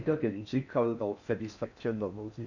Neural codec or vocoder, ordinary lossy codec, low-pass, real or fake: codec, 16 kHz in and 24 kHz out, 0.6 kbps, FocalCodec, streaming, 4096 codes; none; 7.2 kHz; fake